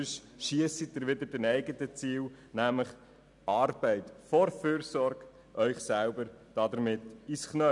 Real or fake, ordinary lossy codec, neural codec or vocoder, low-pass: real; none; none; 10.8 kHz